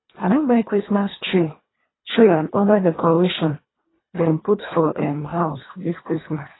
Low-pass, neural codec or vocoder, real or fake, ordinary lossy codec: 7.2 kHz; codec, 24 kHz, 1.5 kbps, HILCodec; fake; AAC, 16 kbps